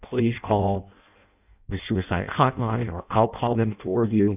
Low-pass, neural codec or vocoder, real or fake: 3.6 kHz; codec, 16 kHz in and 24 kHz out, 0.6 kbps, FireRedTTS-2 codec; fake